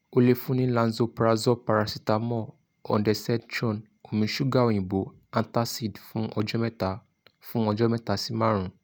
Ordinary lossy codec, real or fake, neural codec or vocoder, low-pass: none; real; none; none